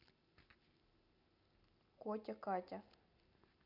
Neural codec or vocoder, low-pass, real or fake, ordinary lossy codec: none; 5.4 kHz; real; none